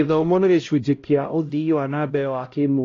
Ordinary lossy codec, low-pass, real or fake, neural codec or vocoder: AAC, 32 kbps; 7.2 kHz; fake; codec, 16 kHz, 0.5 kbps, X-Codec, HuBERT features, trained on LibriSpeech